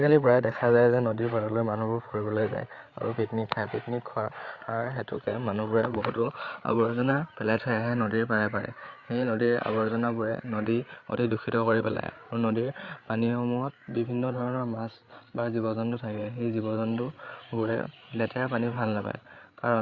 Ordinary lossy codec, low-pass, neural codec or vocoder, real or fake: Opus, 64 kbps; 7.2 kHz; codec, 16 kHz, 8 kbps, FreqCodec, larger model; fake